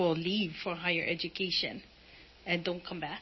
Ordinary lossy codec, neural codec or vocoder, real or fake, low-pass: MP3, 24 kbps; none; real; 7.2 kHz